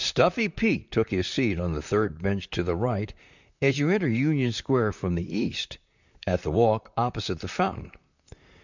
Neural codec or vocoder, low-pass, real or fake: vocoder, 44.1 kHz, 128 mel bands, Pupu-Vocoder; 7.2 kHz; fake